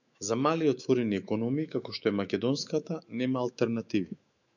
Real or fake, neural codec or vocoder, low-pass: fake; autoencoder, 48 kHz, 128 numbers a frame, DAC-VAE, trained on Japanese speech; 7.2 kHz